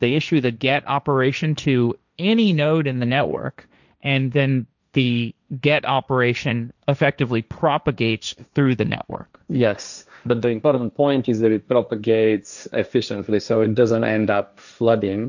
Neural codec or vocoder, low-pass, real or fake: codec, 16 kHz, 1.1 kbps, Voila-Tokenizer; 7.2 kHz; fake